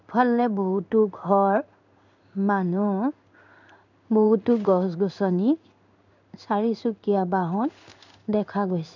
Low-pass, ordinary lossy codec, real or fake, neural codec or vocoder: 7.2 kHz; none; fake; codec, 16 kHz in and 24 kHz out, 1 kbps, XY-Tokenizer